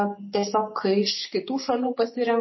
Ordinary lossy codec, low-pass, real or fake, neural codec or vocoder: MP3, 24 kbps; 7.2 kHz; real; none